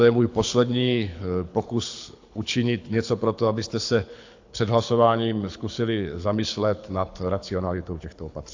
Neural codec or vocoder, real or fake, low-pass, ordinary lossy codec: codec, 24 kHz, 6 kbps, HILCodec; fake; 7.2 kHz; AAC, 48 kbps